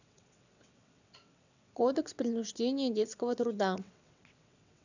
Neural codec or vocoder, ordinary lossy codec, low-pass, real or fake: vocoder, 22.05 kHz, 80 mel bands, WaveNeXt; none; 7.2 kHz; fake